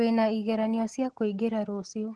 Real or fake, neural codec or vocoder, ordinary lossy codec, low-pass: real; none; Opus, 16 kbps; 10.8 kHz